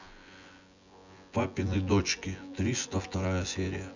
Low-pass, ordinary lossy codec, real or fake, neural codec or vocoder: 7.2 kHz; none; fake; vocoder, 24 kHz, 100 mel bands, Vocos